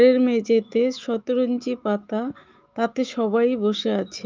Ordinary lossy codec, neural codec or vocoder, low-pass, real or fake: Opus, 24 kbps; autoencoder, 48 kHz, 128 numbers a frame, DAC-VAE, trained on Japanese speech; 7.2 kHz; fake